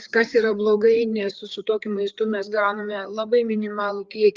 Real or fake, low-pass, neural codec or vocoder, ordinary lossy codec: fake; 7.2 kHz; codec, 16 kHz, 4 kbps, FreqCodec, larger model; Opus, 24 kbps